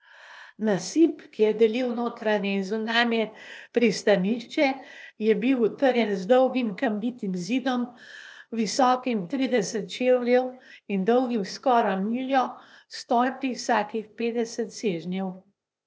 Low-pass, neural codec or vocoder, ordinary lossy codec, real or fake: none; codec, 16 kHz, 0.8 kbps, ZipCodec; none; fake